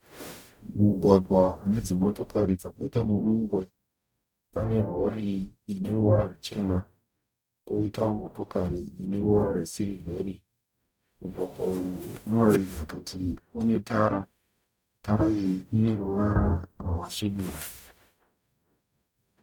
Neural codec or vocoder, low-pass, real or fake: codec, 44.1 kHz, 0.9 kbps, DAC; 19.8 kHz; fake